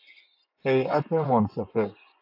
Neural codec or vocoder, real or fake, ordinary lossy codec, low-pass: vocoder, 24 kHz, 100 mel bands, Vocos; fake; AAC, 48 kbps; 5.4 kHz